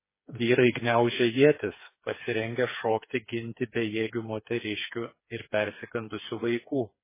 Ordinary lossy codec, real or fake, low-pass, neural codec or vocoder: MP3, 16 kbps; fake; 3.6 kHz; codec, 16 kHz, 4 kbps, FreqCodec, smaller model